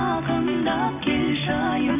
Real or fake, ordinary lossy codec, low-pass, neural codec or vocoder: real; none; 3.6 kHz; none